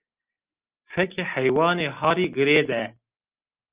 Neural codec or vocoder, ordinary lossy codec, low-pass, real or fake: none; Opus, 24 kbps; 3.6 kHz; real